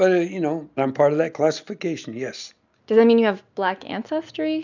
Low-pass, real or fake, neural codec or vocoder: 7.2 kHz; real; none